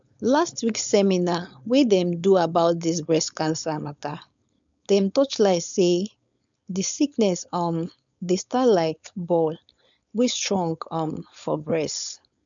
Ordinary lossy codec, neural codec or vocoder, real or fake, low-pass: none; codec, 16 kHz, 4.8 kbps, FACodec; fake; 7.2 kHz